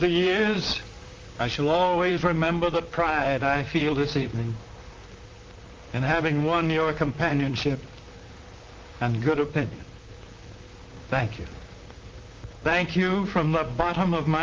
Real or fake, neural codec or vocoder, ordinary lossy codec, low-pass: fake; vocoder, 44.1 kHz, 128 mel bands, Pupu-Vocoder; Opus, 32 kbps; 7.2 kHz